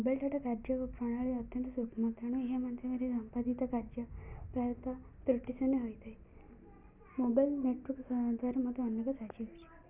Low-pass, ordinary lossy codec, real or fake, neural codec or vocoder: 3.6 kHz; none; real; none